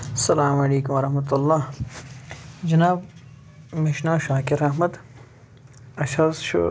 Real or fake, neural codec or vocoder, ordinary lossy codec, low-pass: real; none; none; none